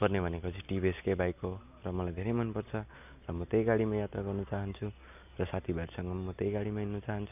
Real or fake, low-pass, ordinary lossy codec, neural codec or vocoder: real; 3.6 kHz; none; none